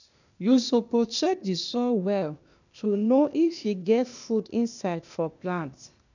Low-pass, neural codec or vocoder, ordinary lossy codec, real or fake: 7.2 kHz; codec, 16 kHz, 0.8 kbps, ZipCodec; none; fake